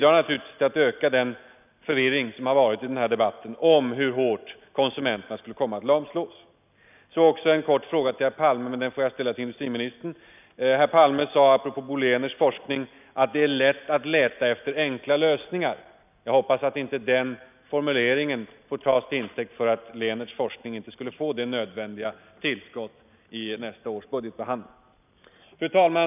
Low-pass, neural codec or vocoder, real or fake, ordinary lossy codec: 3.6 kHz; none; real; none